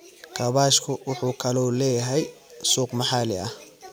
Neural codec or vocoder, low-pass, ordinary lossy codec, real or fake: none; none; none; real